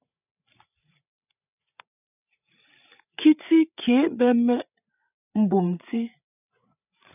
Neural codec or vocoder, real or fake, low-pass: codec, 16 kHz, 8 kbps, FreqCodec, larger model; fake; 3.6 kHz